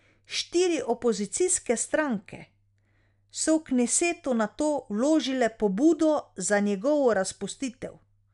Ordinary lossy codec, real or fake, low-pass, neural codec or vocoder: none; real; 10.8 kHz; none